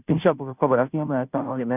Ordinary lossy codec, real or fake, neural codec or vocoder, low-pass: none; fake; codec, 16 kHz, 0.5 kbps, FunCodec, trained on Chinese and English, 25 frames a second; 3.6 kHz